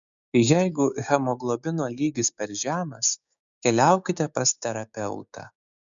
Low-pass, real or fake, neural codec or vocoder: 7.2 kHz; fake; codec, 16 kHz, 6 kbps, DAC